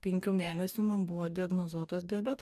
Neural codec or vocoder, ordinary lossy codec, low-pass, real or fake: codec, 44.1 kHz, 2.6 kbps, DAC; AAC, 96 kbps; 14.4 kHz; fake